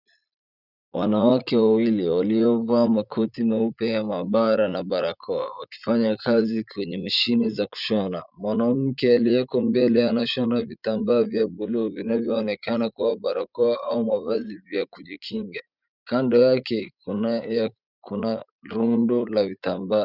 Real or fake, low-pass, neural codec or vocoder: fake; 5.4 kHz; vocoder, 44.1 kHz, 80 mel bands, Vocos